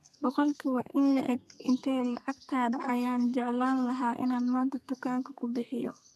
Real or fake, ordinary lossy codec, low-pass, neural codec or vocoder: fake; none; 14.4 kHz; codec, 32 kHz, 1.9 kbps, SNAC